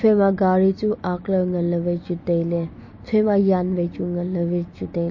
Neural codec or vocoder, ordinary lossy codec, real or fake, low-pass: none; MP3, 32 kbps; real; 7.2 kHz